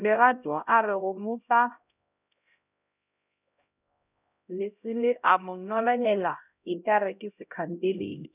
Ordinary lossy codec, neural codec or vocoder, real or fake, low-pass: none; codec, 16 kHz, 0.5 kbps, X-Codec, HuBERT features, trained on LibriSpeech; fake; 3.6 kHz